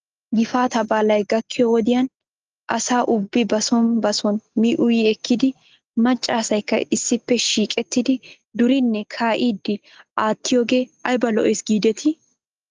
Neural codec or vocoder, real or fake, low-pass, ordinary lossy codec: none; real; 7.2 kHz; Opus, 24 kbps